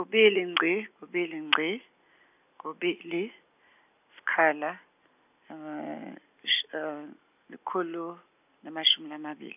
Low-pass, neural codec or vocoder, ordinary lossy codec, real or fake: 3.6 kHz; none; none; real